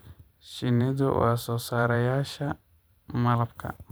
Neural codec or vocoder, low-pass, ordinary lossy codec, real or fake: vocoder, 44.1 kHz, 128 mel bands every 512 samples, BigVGAN v2; none; none; fake